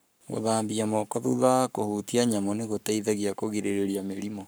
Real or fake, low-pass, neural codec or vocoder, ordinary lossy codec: fake; none; codec, 44.1 kHz, 7.8 kbps, Pupu-Codec; none